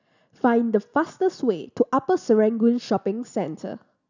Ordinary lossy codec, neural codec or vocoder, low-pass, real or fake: none; none; 7.2 kHz; real